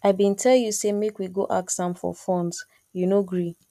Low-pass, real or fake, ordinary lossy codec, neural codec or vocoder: 14.4 kHz; real; none; none